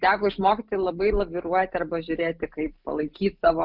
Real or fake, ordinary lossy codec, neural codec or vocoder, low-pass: real; Opus, 24 kbps; none; 5.4 kHz